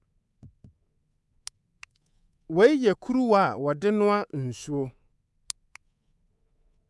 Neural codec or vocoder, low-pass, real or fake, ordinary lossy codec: codec, 24 kHz, 3.1 kbps, DualCodec; none; fake; none